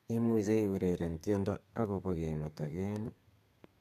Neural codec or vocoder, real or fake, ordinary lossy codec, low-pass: codec, 32 kHz, 1.9 kbps, SNAC; fake; none; 14.4 kHz